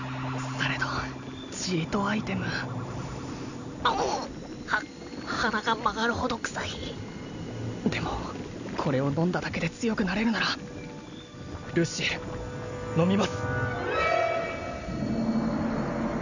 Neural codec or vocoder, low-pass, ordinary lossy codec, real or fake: none; 7.2 kHz; none; real